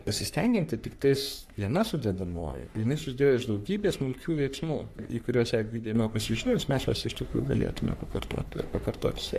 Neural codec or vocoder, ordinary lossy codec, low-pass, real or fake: codec, 44.1 kHz, 3.4 kbps, Pupu-Codec; MP3, 96 kbps; 14.4 kHz; fake